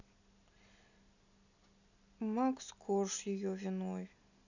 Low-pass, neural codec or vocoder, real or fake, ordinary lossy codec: 7.2 kHz; none; real; none